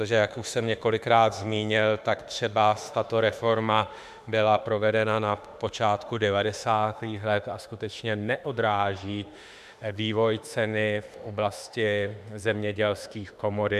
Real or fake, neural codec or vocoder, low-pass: fake; autoencoder, 48 kHz, 32 numbers a frame, DAC-VAE, trained on Japanese speech; 14.4 kHz